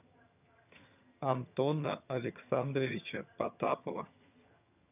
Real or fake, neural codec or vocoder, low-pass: fake; vocoder, 22.05 kHz, 80 mel bands, HiFi-GAN; 3.6 kHz